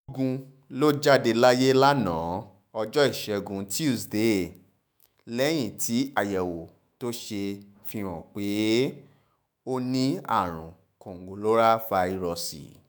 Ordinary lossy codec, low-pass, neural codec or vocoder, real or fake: none; none; autoencoder, 48 kHz, 128 numbers a frame, DAC-VAE, trained on Japanese speech; fake